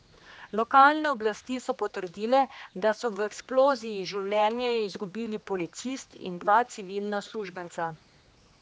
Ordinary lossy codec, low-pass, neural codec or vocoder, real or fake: none; none; codec, 16 kHz, 2 kbps, X-Codec, HuBERT features, trained on general audio; fake